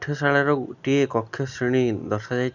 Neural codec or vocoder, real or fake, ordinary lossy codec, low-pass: none; real; none; 7.2 kHz